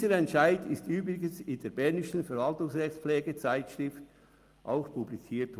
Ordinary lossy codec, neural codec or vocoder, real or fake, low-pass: Opus, 32 kbps; none; real; 14.4 kHz